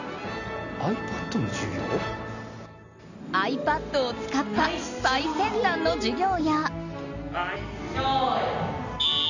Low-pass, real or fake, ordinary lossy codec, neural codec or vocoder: 7.2 kHz; real; none; none